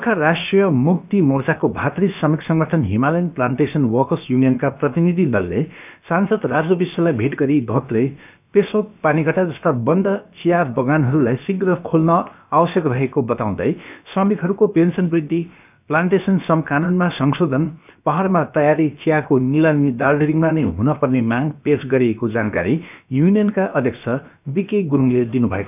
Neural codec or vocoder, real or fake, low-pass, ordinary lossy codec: codec, 16 kHz, about 1 kbps, DyCAST, with the encoder's durations; fake; 3.6 kHz; none